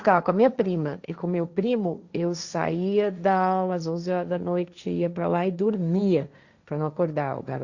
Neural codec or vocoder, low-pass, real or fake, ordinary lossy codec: codec, 16 kHz, 1.1 kbps, Voila-Tokenizer; 7.2 kHz; fake; Opus, 64 kbps